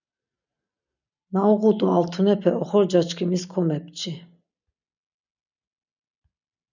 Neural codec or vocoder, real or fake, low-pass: none; real; 7.2 kHz